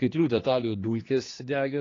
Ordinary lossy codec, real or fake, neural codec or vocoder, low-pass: AAC, 32 kbps; fake; codec, 16 kHz, 2 kbps, X-Codec, HuBERT features, trained on general audio; 7.2 kHz